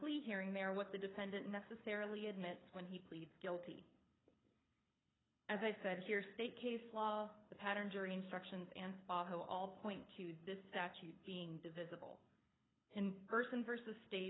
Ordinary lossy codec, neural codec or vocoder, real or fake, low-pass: AAC, 16 kbps; codec, 24 kHz, 6 kbps, HILCodec; fake; 7.2 kHz